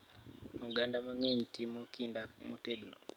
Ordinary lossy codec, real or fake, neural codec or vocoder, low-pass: none; fake; codec, 44.1 kHz, 7.8 kbps, DAC; 19.8 kHz